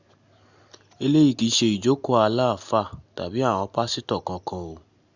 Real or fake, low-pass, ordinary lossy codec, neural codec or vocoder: real; 7.2 kHz; Opus, 64 kbps; none